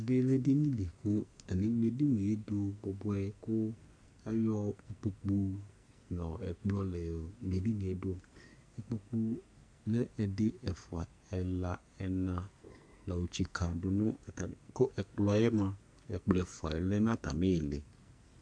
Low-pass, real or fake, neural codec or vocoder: 9.9 kHz; fake; codec, 44.1 kHz, 2.6 kbps, SNAC